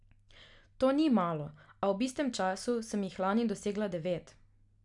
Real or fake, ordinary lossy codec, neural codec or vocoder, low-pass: real; none; none; 10.8 kHz